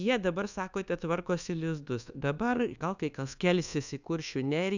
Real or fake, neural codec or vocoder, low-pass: fake; codec, 24 kHz, 1.2 kbps, DualCodec; 7.2 kHz